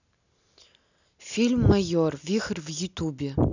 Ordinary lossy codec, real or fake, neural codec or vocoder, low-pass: none; real; none; 7.2 kHz